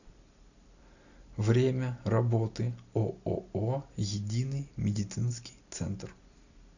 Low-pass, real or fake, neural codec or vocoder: 7.2 kHz; real; none